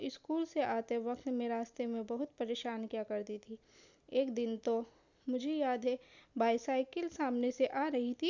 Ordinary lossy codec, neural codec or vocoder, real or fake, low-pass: none; none; real; 7.2 kHz